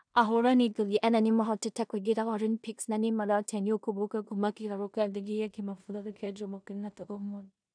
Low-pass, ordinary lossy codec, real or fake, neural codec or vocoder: 9.9 kHz; none; fake; codec, 16 kHz in and 24 kHz out, 0.4 kbps, LongCat-Audio-Codec, two codebook decoder